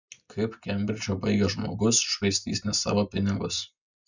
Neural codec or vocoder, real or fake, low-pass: none; real; 7.2 kHz